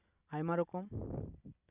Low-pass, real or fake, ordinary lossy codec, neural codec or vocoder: 3.6 kHz; real; none; none